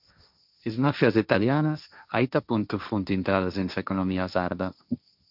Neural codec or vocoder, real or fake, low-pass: codec, 16 kHz, 1.1 kbps, Voila-Tokenizer; fake; 5.4 kHz